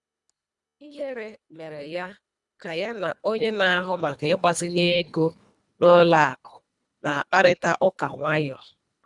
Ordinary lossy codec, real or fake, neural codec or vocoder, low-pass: none; fake; codec, 24 kHz, 1.5 kbps, HILCodec; 10.8 kHz